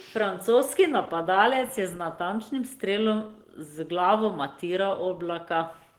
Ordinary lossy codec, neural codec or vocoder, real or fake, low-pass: Opus, 16 kbps; none; real; 19.8 kHz